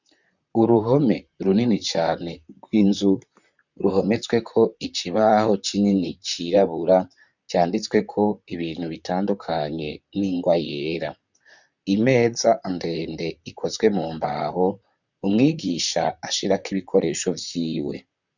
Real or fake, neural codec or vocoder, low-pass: fake; vocoder, 44.1 kHz, 128 mel bands, Pupu-Vocoder; 7.2 kHz